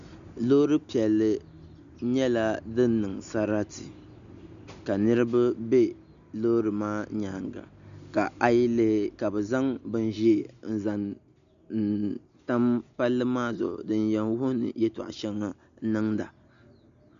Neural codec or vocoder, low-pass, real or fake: none; 7.2 kHz; real